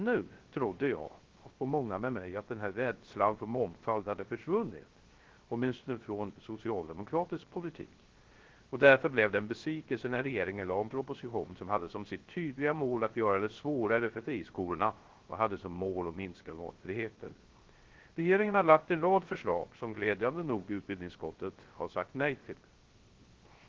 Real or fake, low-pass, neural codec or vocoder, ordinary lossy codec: fake; 7.2 kHz; codec, 16 kHz, 0.3 kbps, FocalCodec; Opus, 16 kbps